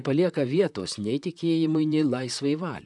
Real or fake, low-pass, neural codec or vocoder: fake; 10.8 kHz; vocoder, 44.1 kHz, 128 mel bands, Pupu-Vocoder